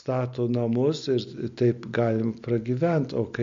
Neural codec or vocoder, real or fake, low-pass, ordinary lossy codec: none; real; 7.2 kHz; MP3, 48 kbps